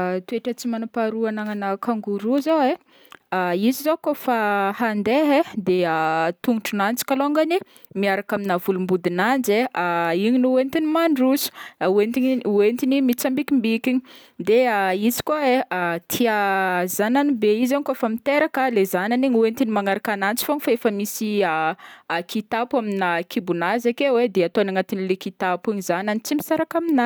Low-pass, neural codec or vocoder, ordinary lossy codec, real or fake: none; none; none; real